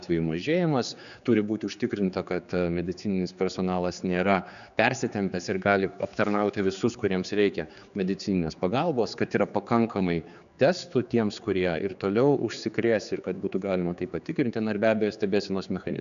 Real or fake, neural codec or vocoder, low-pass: fake; codec, 16 kHz, 4 kbps, X-Codec, HuBERT features, trained on general audio; 7.2 kHz